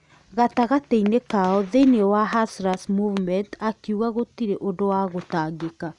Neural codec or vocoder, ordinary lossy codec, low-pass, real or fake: none; none; 10.8 kHz; real